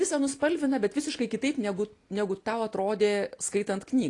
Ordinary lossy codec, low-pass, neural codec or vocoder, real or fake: AAC, 48 kbps; 10.8 kHz; none; real